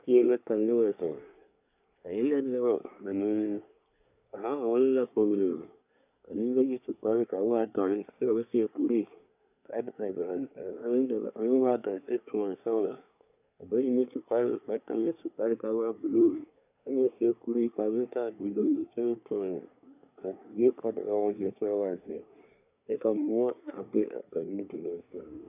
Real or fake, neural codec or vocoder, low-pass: fake; codec, 24 kHz, 1 kbps, SNAC; 3.6 kHz